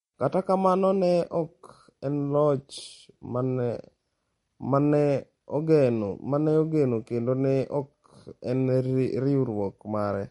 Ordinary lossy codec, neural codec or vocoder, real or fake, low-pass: MP3, 48 kbps; none; real; 14.4 kHz